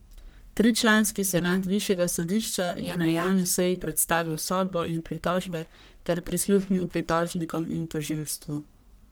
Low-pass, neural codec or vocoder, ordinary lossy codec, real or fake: none; codec, 44.1 kHz, 1.7 kbps, Pupu-Codec; none; fake